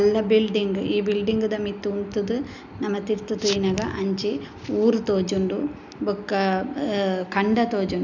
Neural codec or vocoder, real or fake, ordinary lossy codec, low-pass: none; real; none; 7.2 kHz